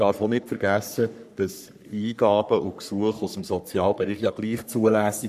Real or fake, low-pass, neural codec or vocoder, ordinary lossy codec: fake; 14.4 kHz; codec, 44.1 kHz, 3.4 kbps, Pupu-Codec; none